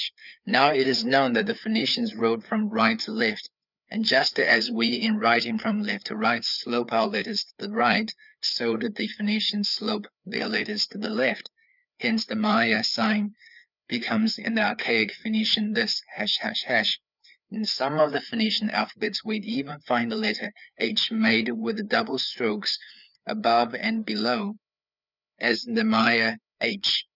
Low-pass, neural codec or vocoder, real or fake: 5.4 kHz; codec, 16 kHz, 4 kbps, FreqCodec, larger model; fake